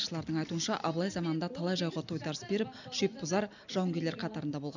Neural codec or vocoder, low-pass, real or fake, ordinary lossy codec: none; 7.2 kHz; real; none